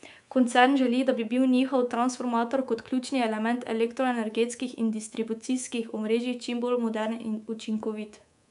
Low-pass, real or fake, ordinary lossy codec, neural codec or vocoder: 10.8 kHz; fake; none; codec, 24 kHz, 3.1 kbps, DualCodec